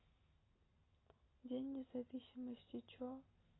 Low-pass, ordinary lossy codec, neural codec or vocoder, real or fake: 7.2 kHz; AAC, 16 kbps; none; real